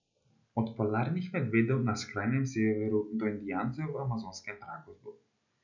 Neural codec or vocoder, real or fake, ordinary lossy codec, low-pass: none; real; none; 7.2 kHz